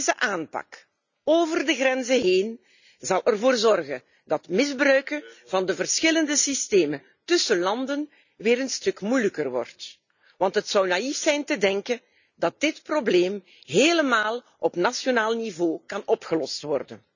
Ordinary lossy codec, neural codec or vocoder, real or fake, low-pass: none; none; real; 7.2 kHz